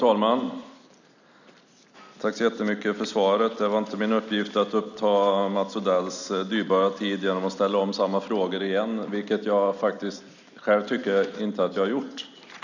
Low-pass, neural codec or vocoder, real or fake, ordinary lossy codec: 7.2 kHz; none; real; none